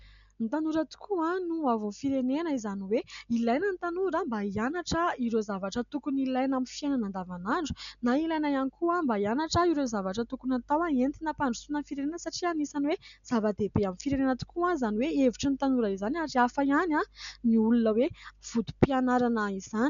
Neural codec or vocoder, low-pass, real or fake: none; 7.2 kHz; real